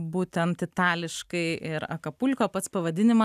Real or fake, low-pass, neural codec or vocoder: real; 14.4 kHz; none